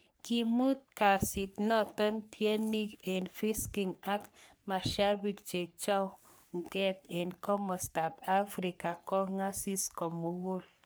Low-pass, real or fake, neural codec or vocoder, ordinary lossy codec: none; fake; codec, 44.1 kHz, 3.4 kbps, Pupu-Codec; none